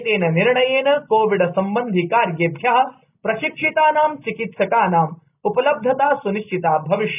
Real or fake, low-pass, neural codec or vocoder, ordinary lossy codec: real; 3.6 kHz; none; none